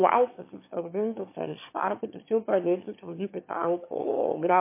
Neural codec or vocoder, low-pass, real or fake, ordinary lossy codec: autoencoder, 22.05 kHz, a latent of 192 numbers a frame, VITS, trained on one speaker; 3.6 kHz; fake; MP3, 24 kbps